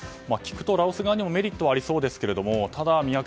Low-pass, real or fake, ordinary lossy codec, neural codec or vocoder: none; real; none; none